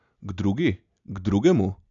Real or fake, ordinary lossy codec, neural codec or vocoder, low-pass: real; none; none; 7.2 kHz